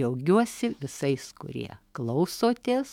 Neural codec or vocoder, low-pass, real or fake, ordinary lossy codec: autoencoder, 48 kHz, 128 numbers a frame, DAC-VAE, trained on Japanese speech; 19.8 kHz; fake; MP3, 96 kbps